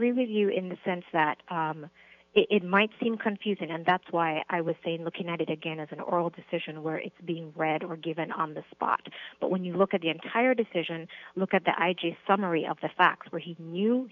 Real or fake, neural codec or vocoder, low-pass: fake; autoencoder, 48 kHz, 128 numbers a frame, DAC-VAE, trained on Japanese speech; 7.2 kHz